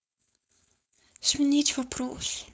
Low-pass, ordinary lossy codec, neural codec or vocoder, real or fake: none; none; codec, 16 kHz, 4.8 kbps, FACodec; fake